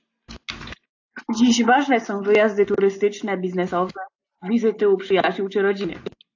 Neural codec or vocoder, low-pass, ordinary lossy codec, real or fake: none; 7.2 kHz; AAC, 48 kbps; real